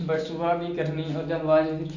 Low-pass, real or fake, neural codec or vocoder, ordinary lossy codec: 7.2 kHz; real; none; none